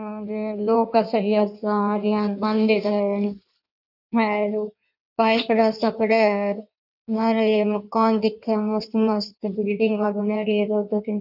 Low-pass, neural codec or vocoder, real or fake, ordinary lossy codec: 5.4 kHz; codec, 16 kHz in and 24 kHz out, 1.1 kbps, FireRedTTS-2 codec; fake; none